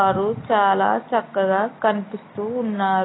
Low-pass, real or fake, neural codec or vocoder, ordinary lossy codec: 7.2 kHz; real; none; AAC, 16 kbps